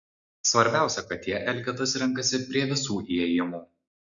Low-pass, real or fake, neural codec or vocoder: 7.2 kHz; real; none